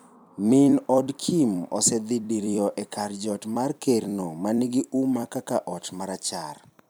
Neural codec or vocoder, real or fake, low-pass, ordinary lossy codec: vocoder, 44.1 kHz, 128 mel bands every 256 samples, BigVGAN v2; fake; none; none